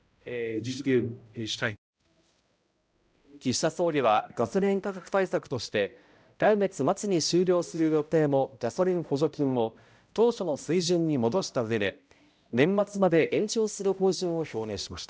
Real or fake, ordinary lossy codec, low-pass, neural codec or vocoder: fake; none; none; codec, 16 kHz, 0.5 kbps, X-Codec, HuBERT features, trained on balanced general audio